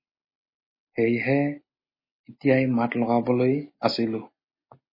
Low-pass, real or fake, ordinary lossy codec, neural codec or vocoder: 5.4 kHz; real; MP3, 24 kbps; none